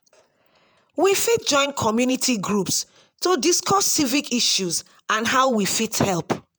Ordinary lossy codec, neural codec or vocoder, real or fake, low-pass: none; vocoder, 48 kHz, 128 mel bands, Vocos; fake; none